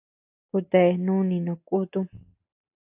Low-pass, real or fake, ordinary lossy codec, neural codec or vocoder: 3.6 kHz; real; AAC, 32 kbps; none